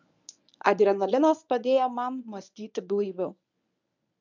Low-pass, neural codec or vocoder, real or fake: 7.2 kHz; codec, 24 kHz, 0.9 kbps, WavTokenizer, medium speech release version 1; fake